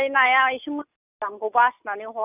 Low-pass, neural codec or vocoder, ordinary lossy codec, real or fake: 3.6 kHz; none; none; real